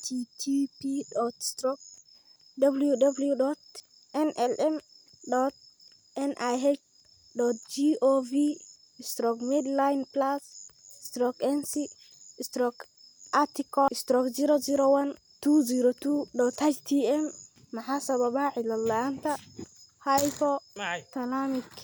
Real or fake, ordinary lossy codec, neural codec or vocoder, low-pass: real; none; none; none